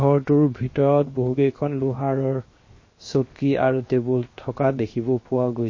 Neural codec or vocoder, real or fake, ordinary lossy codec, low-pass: codec, 16 kHz, 0.7 kbps, FocalCodec; fake; MP3, 32 kbps; 7.2 kHz